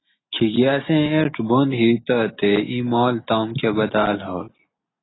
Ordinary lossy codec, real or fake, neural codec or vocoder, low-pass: AAC, 16 kbps; real; none; 7.2 kHz